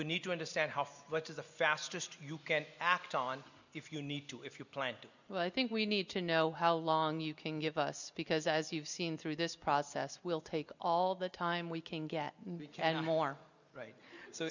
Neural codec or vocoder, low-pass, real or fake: none; 7.2 kHz; real